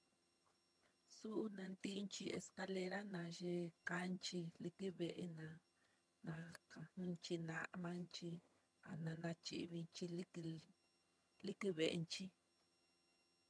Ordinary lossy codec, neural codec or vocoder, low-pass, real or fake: none; vocoder, 22.05 kHz, 80 mel bands, HiFi-GAN; none; fake